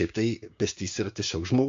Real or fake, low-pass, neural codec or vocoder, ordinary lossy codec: fake; 7.2 kHz; codec, 16 kHz, 6 kbps, DAC; AAC, 48 kbps